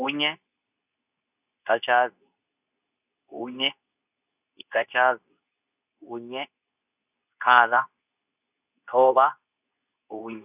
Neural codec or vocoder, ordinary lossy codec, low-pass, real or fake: codec, 24 kHz, 0.9 kbps, WavTokenizer, medium speech release version 2; none; 3.6 kHz; fake